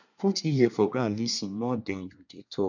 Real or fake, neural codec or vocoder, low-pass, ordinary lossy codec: fake; codec, 24 kHz, 1 kbps, SNAC; 7.2 kHz; none